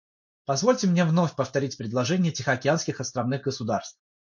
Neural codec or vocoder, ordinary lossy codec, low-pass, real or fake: none; MP3, 48 kbps; 7.2 kHz; real